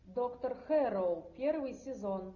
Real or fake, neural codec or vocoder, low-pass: real; none; 7.2 kHz